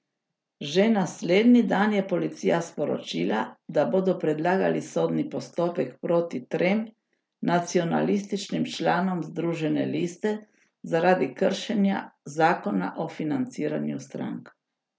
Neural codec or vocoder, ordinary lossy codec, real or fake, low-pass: none; none; real; none